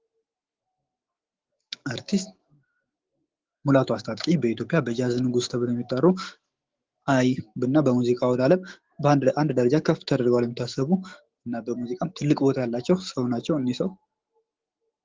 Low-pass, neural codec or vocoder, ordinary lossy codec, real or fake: 7.2 kHz; autoencoder, 48 kHz, 128 numbers a frame, DAC-VAE, trained on Japanese speech; Opus, 16 kbps; fake